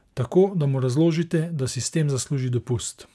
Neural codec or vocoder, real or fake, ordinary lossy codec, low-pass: none; real; none; none